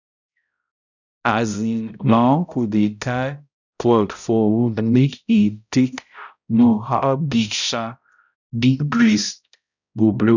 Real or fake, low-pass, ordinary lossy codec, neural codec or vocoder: fake; 7.2 kHz; none; codec, 16 kHz, 0.5 kbps, X-Codec, HuBERT features, trained on balanced general audio